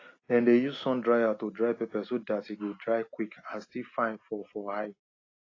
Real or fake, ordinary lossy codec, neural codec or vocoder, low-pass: real; AAC, 32 kbps; none; 7.2 kHz